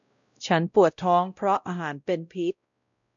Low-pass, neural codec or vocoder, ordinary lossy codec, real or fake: 7.2 kHz; codec, 16 kHz, 0.5 kbps, X-Codec, WavLM features, trained on Multilingual LibriSpeech; none; fake